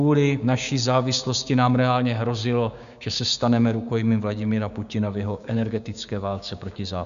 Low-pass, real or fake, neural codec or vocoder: 7.2 kHz; fake; codec, 16 kHz, 6 kbps, DAC